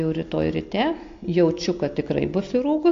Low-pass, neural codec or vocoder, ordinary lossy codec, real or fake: 7.2 kHz; none; AAC, 48 kbps; real